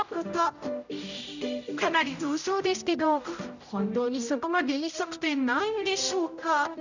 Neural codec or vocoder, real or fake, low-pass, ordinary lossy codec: codec, 16 kHz, 0.5 kbps, X-Codec, HuBERT features, trained on general audio; fake; 7.2 kHz; none